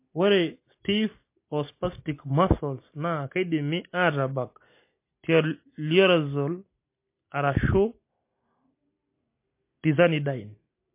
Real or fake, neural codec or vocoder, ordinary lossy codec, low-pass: real; none; MP3, 24 kbps; 3.6 kHz